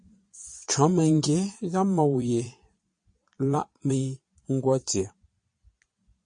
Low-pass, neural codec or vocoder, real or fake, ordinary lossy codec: 9.9 kHz; vocoder, 22.05 kHz, 80 mel bands, Vocos; fake; MP3, 48 kbps